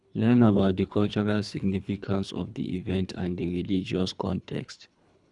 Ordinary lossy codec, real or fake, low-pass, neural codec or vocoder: none; fake; 10.8 kHz; codec, 24 kHz, 3 kbps, HILCodec